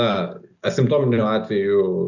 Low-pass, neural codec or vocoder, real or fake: 7.2 kHz; none; real